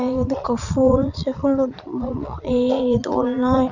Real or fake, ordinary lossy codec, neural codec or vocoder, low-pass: fake; none; vocoder, 22.05 kHz, 80 mel bands, Vocos; 7.2 kHz